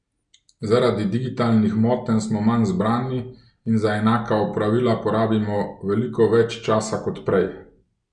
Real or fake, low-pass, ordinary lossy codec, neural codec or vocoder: real; 9.9 kHz; none; none